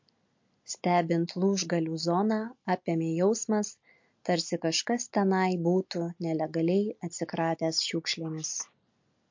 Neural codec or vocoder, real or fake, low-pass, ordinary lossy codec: none; real; 7.2 kHz; MP3, 48 kbps